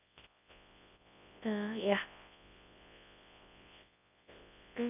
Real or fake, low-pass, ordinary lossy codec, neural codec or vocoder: fake; 3.6 kHz; none; codec, 24 kHz, 0.9 kbps, WavTokenizer, large speech release